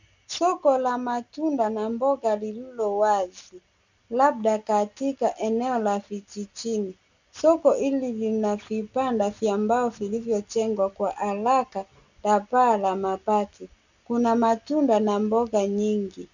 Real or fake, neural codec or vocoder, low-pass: real; none; 7.2 kHz